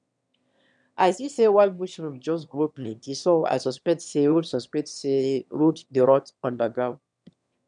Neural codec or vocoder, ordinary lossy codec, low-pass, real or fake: autoencoder, 22.05 kHz, a latent of 192 numbers a frame, VITS, trained on one speaker; none; 9.9 kHz; fake